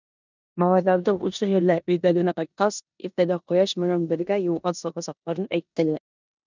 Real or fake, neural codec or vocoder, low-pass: fake; codec, 16 kHz in and 24 kHz out, 0.9 kbps, LongCat-Audio-Codec, four codebook decoder; 7.2 kHz